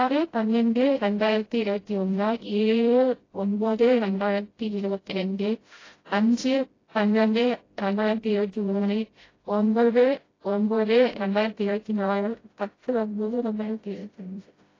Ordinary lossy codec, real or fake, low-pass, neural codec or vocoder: AAC, 32 kbps; fake; 7.2 kHz; codec, 16 kHz, 0.5 kbps, FreqCodec, smaller model